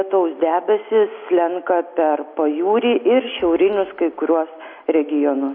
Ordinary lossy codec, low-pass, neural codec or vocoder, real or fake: MP3, 32 kbps; 5.4 kHz; none; real